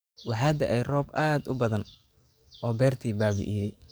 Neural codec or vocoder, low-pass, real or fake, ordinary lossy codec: codec, 44.1 kHz, 7.8 kbps, DAC; none; fake; none